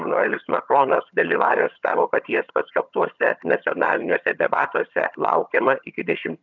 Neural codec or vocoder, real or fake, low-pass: vocoder, 22.05 kHz, 80 mel bands, HiFi-GAN; fake; 7.2 kHz